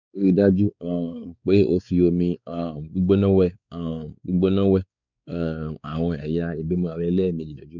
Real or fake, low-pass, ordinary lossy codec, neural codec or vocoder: fake; 7.2 kHz; none; codec, 16 kHz, 4 kbps, X-Codec, WavLM features, trained on Multilingual LibriSpeech